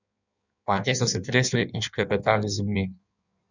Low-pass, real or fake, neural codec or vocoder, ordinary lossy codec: 7.2 kHz; fake; codec, 16 kHz in and 24 kHz out, 1.1 kbps, FireRedTTS-2 codec; none